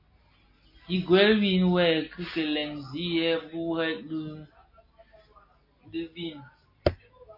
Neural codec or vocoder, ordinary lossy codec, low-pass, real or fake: none; MP3, 32 kbps; 5.4 kHz; real